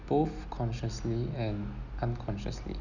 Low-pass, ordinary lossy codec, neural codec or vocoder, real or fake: 7.2 kHz; none; none; real